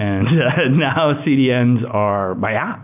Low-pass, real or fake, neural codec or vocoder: 3.6 kHz; real; none